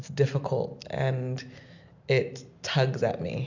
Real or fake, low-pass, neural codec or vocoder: real; 7.2 kHz; none